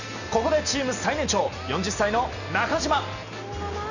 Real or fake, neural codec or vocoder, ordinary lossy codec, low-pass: real; none; none; 7.2 kHz